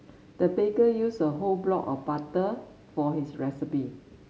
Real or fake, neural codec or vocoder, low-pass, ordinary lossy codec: real; none; none; none